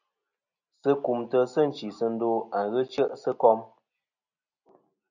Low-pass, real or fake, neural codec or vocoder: 7.2 kHz; real; none